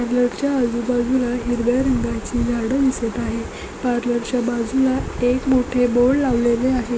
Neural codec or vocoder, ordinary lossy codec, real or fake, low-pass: none; none; real; none